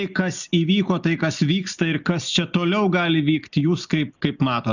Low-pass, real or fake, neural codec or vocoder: 7.2 kHz; real; none